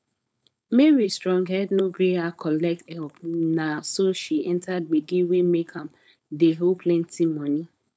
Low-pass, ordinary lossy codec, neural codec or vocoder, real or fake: none; none; codec, 16 kHz, 4.8 kbps, FACodec; fake